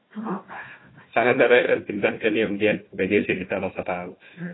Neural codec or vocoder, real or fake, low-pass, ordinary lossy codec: codec, 16 kHz, 1 kbps, FunCodec, trained on Chinese and English, 50 frames a second; fake; 7.2 kHz; AAC, 16 kbps